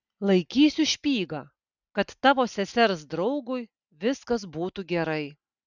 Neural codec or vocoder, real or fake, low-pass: none; real; 7.2 kHz